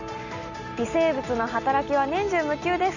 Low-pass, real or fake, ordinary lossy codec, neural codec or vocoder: 7.2 kHz; real; none; none